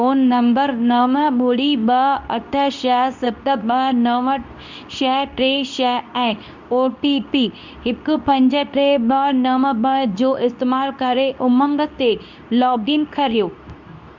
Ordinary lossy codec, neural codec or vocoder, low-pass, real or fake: none; codec, 24 kHz, 0.9 kbps, WavTokenizer, medium speech release version 2; 7.2 kHz; fake